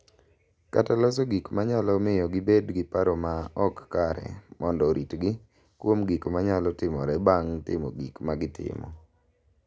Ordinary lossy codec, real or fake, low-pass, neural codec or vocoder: none; real; none; none